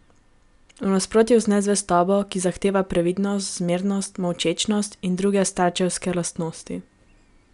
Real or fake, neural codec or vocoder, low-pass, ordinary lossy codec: real; none; 10.8 kHz; none